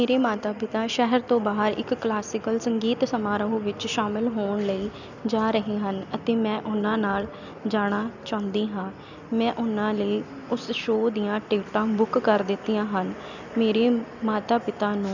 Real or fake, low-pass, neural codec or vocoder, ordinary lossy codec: real; 7.2 kHz; none; none